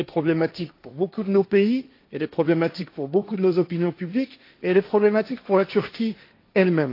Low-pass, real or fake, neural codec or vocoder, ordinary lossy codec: 5.4 kHz; fake; codec, 16 kHz, 1.1 kbps, Voila-Tokenizer; AAC, 32 kbps